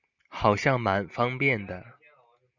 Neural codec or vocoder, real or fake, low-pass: none; real; 7.2 kHz